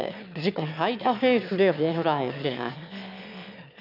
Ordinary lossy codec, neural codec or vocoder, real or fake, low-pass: none; autoencoder, 22.05 kHz, a latent of 192 numbers a frame, VITS, trained on one speaker; fake; 5.4 kHz